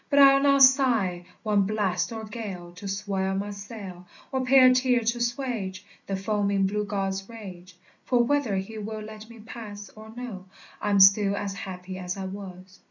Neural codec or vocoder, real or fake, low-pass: none; real; 7.2 kHz